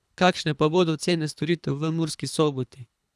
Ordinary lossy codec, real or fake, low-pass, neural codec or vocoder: none; fake; none; codec, 24 kHz, 3 kbps, HILCodec